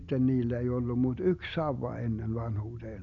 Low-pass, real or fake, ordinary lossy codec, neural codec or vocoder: 7.2 kHz; real; none; none